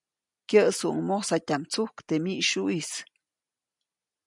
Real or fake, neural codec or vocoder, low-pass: real; none; 10.8 kHz